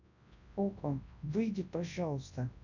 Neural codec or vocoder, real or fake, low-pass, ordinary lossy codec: codec, 24 kHz, 0.9 kbps, WavTokenizer, large speech release; fake; 7.2 kHz; none